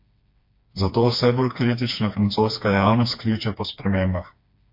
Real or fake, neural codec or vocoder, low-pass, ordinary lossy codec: fake; codec, 16 kHz, 2 kbps, FreqCodec, smaller model; 5.4 kHz; MP3, 32 kbps